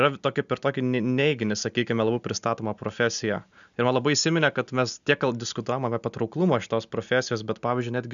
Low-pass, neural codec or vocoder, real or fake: 7.2 kHz; none; real